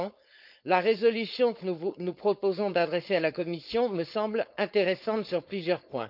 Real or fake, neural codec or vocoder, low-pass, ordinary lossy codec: fake; codec, 16 kHz, 4.8 kbps, FACodec; 5.4 kHz; none